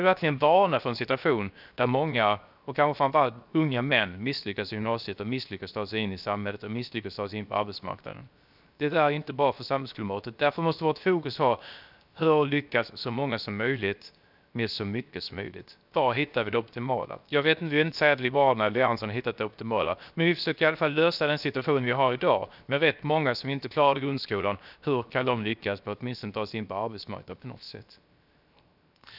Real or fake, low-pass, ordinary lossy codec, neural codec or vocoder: fake; 5.4 kHz; none; codec, 16 kHz, 0.7 kbps, FocalCodec